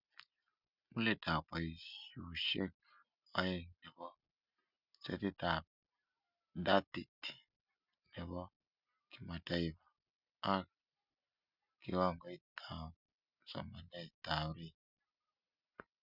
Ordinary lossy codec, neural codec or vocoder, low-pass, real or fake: none; none; 5.4 kHz; real